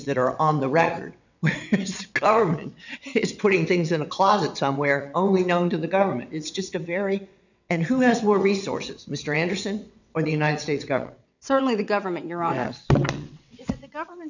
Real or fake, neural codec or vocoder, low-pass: fake; vocoder, 22.05 kHz, 80 mel bands, WaveNeXt; 7.2 kHz